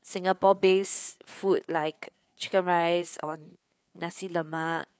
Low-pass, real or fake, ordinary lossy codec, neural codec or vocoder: none; fake; none; codec, 16 kHz, 4 kbps, FreqCodec, larger model